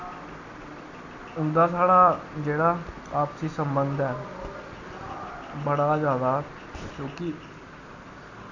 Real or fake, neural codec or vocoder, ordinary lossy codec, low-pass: real; none; none; 7.2 kHz